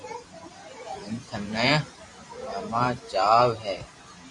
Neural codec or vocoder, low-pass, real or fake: vocoder, 44.1 kHz, 128 mel bands every 512 samples, BigVGAN v2; 10.8 kHz; fake